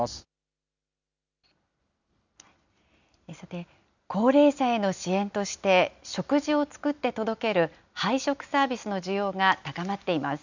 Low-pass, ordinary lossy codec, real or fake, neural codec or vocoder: 7.2 kHz; none; real; none